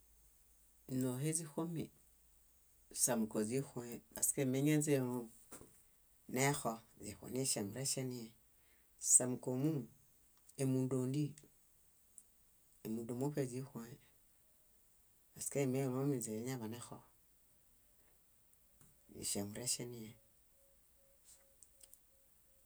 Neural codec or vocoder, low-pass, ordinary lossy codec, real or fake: none; none; none; real